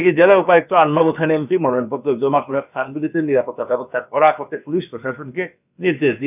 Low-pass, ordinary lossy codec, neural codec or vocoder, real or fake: 3.6 kHz; none; codec, 16 kHz, about 1 kbps, DyCAST, with the encoder's durations; fake